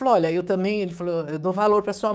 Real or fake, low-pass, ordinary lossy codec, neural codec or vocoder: fake; none; none; codec, 16 kHz, 6 kbps, DAC